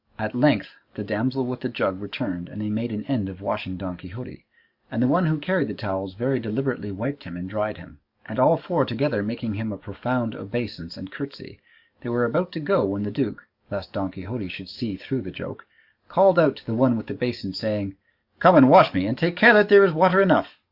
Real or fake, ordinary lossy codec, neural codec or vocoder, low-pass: fake; Opus, 64 kbps; codec, 44.1 kHz, 7.8 kbps, DAC; 5.4 kHz